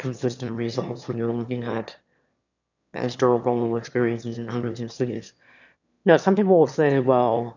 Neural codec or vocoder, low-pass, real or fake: autoencoder, 22.05 kHz, a latent of 192 numbers a frame, VITS, trained on one speaker; 7.2 kHz; fake